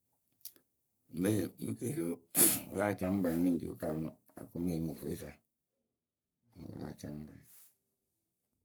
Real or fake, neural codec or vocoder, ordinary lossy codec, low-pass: fake; codec, 44.1 kHz, 3.4 kbps, Pupu-Codec; none; none